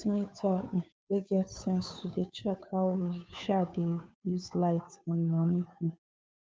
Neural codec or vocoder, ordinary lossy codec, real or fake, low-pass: codec, 16 kHz, 2 kbps, FunCodec, trained on Chinese and English, 25 frames a second; none; fake; none